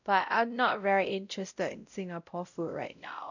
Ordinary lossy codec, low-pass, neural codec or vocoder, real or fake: none; 7.2 kHz; codec, 16 kHz, 0.5 kbps, X-Codec, WavLM features, trained on Multilingual LibriSpeech; fake